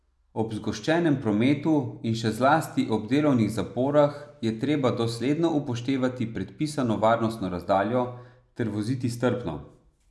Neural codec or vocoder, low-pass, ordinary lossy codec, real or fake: none; none; none; real